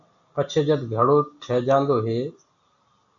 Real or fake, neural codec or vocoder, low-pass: real; none; 7.2 kHz